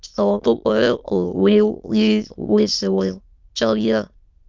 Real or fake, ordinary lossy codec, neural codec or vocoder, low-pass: fake; Opus, 32 kbps; autoencoder, 22.05 kHz, a latent of 192 numbers a frame, VITS, trained on many speakers; 7.2 kHz